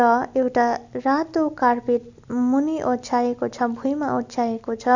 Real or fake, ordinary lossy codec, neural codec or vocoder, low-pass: real; none; none; 7.2 kHz